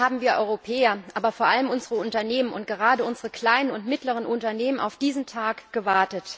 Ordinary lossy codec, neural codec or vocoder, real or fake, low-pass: none; none; real; none